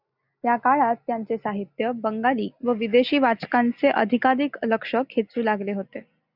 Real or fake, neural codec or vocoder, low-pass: real; none; 5.4 kHz